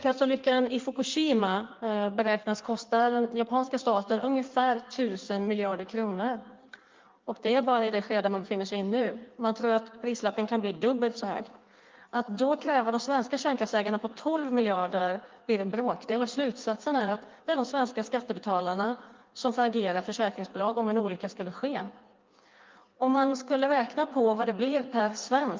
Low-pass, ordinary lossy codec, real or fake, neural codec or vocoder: 7.2 kHz; Opus, 32 kbps; fake; codec, 16 kHz in and 24 kHz out, 1.1 kbps, FireRedTTS-2 codec